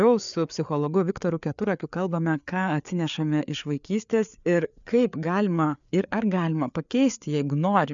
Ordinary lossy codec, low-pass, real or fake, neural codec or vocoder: MP3, 96 kbps; 7.2 kHz; fake; codec, 16 kHz, 4 kbps, FreqCodec, larger model